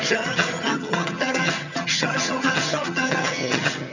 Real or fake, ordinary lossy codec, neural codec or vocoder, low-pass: fake; none; vocoder, 22.05 kHz, 80 mel bands, HiFi-GAN; 7.2 kHz